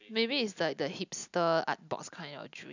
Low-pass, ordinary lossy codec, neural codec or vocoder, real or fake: 7.2 kHz; none; none; real